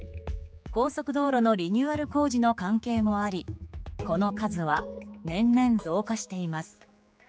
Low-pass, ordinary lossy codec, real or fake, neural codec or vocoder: none; none; fake; codec, 16 kHz, 4 kbps, X-Codec, HuBERT features, trained on general audio